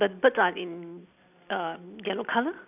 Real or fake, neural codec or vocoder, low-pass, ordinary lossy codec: real; none; 3.6 kHz; none